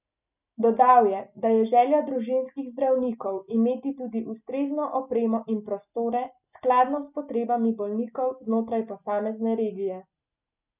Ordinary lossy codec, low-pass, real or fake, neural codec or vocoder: none; 3.6 kHz; real; none